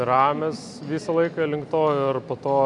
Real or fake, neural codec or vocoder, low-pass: real; none; 10.8 kHz